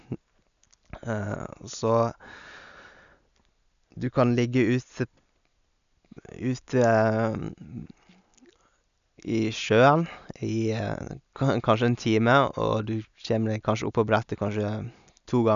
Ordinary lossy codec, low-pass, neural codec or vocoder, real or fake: none; 7.2 kHz; none; real